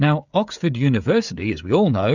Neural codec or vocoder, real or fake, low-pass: codec, 16 kHz, 16 kbps, FreqCodec, smaller model; fake; 7.2 kHz